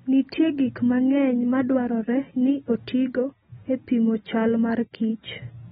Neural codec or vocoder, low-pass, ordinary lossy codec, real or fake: none; 14.4 kHz; AAC, 16 kbps; real